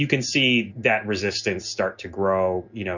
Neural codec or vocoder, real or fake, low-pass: none; real; 7.2 kHz